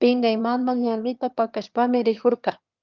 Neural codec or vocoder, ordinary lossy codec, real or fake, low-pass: autoencoder, 22.05 kHz, a latent of 192 numbers a frame, VITS, trained on one speaker; Opus, 24 kbps; fake; 7.2 kHz